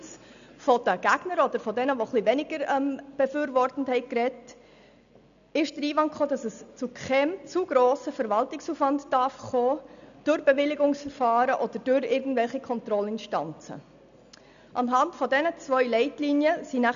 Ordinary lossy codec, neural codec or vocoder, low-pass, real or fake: none; none; 7.2 kHz; real